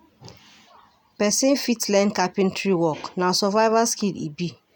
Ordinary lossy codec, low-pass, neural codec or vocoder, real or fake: none; none; none; real